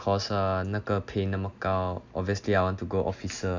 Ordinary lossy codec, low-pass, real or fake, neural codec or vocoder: none; 7.2 kHz; real; none